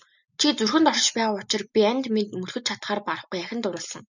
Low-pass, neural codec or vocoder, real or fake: 7.2 kHz; none; real